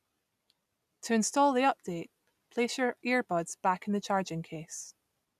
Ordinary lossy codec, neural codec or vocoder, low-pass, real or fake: none; none; 14.4 kHz; real